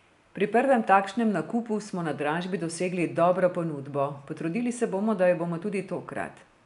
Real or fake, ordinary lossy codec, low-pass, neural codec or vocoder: real; none; 10.8 kHz; none